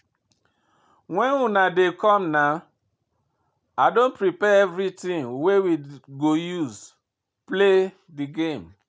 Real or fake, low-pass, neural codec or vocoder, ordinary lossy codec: real; none; none; none